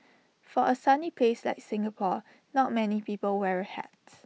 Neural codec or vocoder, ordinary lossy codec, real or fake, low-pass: none; none; real; none